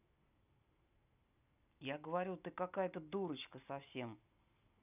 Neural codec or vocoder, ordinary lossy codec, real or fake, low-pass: none; none; real; 3.6 kHz